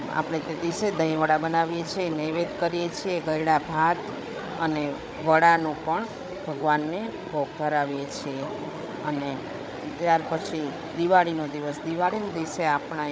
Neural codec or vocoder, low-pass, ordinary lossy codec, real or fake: codec, 16 kHz, 8 kbps, FreqCodec, larger model; none; none; fake